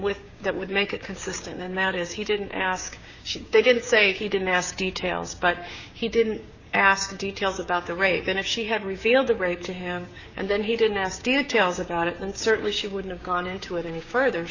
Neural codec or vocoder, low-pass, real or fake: codec, 16 kHz, 6 kbps, DAC; 7.2 kHz; fake